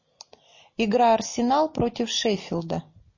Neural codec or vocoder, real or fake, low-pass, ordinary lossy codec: none; real; 7.2 kHz; MP3, 32 kbps